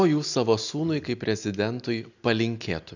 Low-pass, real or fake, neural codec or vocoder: 7.2 kHz; real; none